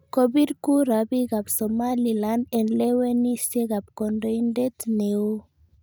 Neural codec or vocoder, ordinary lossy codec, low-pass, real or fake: none; none; none; real